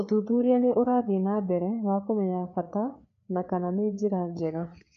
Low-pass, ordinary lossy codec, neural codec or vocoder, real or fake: 7.2 kHz; AAC, 64 kbps; codec, 16 kHz, 4 kbps, FreqCodec, larger model; fake